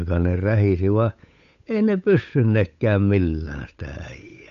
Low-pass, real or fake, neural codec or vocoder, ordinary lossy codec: 7.2 kHz; fake; codec, 16 kHz, 8 kbps, FunCodec, trained on Chinese and English, 25 frames a second; none